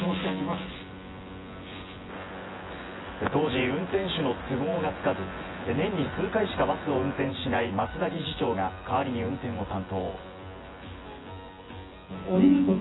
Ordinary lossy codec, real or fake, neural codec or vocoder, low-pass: AAC, 16 kbps; fake; vocoder, 24 kHz, 100 mel bands, Vocos; 7.2 kHz